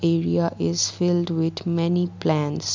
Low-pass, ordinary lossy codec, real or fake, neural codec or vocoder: 7.2 kHz; MP3, 64 kbps; real; none